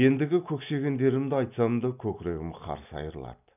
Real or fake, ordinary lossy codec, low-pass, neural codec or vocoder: real; none; 3.6 kHz; none